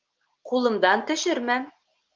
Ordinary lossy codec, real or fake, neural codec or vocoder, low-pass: Opus, 24 kbps; real; none; 7.2 kHz